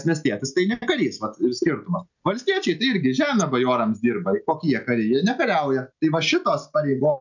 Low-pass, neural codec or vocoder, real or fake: 7.2 kHz; none; real